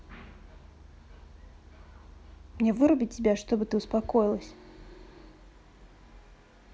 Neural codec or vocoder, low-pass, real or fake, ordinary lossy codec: none; none; real; none